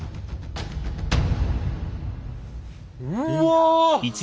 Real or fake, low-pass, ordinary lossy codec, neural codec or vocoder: real; none; none; none